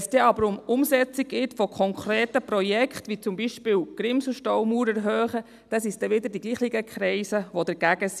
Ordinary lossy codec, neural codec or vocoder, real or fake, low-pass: none; none; real; 14.4 kHz